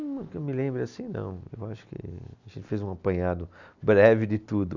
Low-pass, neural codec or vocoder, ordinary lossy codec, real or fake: 7.2 kHz; none; none; real